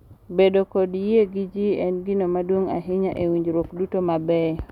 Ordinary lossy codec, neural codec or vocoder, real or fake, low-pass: none; none; real; 19.8 kHz